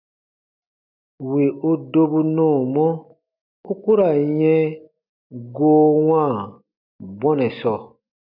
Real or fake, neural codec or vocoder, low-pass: real; none; 5.4 kHz